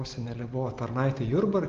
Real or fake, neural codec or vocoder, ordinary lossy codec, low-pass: real; none; Opus, 16 kbps; 7.2 kHz